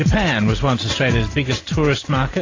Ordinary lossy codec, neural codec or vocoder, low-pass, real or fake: AAC, 32 kbps; none; 7.2 kHz; real